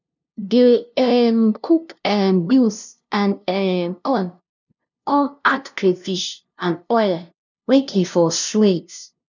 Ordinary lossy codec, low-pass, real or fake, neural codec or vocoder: none; 7.2 kHz; fake; codec, 16 kHz, 0.5 kbps, FunCodec, trained on LibriTTS, 25 frames a second